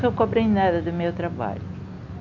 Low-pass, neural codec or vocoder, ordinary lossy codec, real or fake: 7.2 kHz; none; none; real